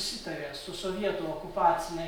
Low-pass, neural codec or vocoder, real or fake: 19.8 kHz; none; real